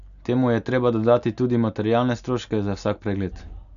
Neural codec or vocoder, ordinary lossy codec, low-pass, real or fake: none; none; 7.2 kHz; real